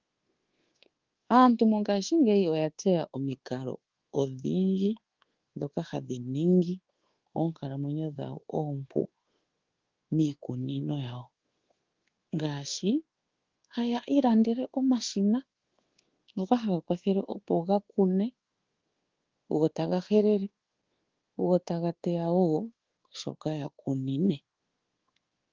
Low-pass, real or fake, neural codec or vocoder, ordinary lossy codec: 7.2 kHz; fake; codec, 24 kHz, 1.2 kbps, DualCodec; Opus, 16 kbps